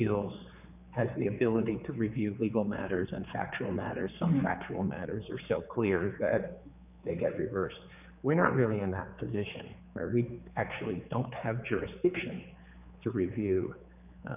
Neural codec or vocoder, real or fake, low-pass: codec, 16 kHz, 4 kbps, X-Codec, HuBERT features, trained on general audio; fake; 3.6 kHz